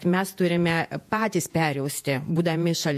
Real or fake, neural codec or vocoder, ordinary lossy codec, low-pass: fake; vocoder, 48 kHz, 128 mel bands, Vocos; MP3, 64 kbps; 14.4 kHz